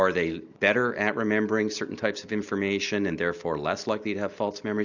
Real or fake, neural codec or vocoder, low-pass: real; none; 7.2 kHz